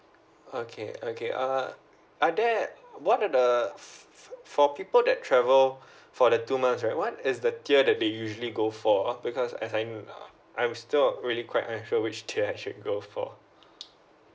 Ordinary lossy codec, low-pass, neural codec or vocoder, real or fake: none; none; none; real